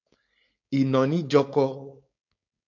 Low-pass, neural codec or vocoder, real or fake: 7.2 kHz; codec, 16 kHz, 4.8 kbps, FACodec; fake